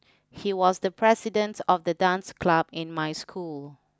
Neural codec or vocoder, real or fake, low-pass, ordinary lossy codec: none; real; none; none